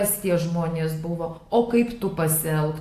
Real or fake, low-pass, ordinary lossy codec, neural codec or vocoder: real; 14.4 kHz; AAC, 64 kbps; none